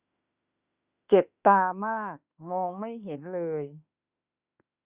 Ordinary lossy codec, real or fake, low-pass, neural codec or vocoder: Opus, 64 kbps; fake; 3.6 kHz; autoencoder, 48 kHz, 32 numbers a frame, DAC-VAE, trained on Japanese speech